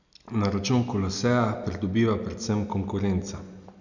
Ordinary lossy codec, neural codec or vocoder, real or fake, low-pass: none; none; real; 7.2 kHz